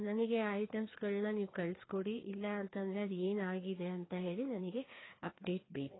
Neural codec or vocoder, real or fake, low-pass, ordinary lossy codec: codec, 16 kHz, 2 kbps, FreqCodec, larger model; fake; 7.2 kHz; AAC, 16 kbps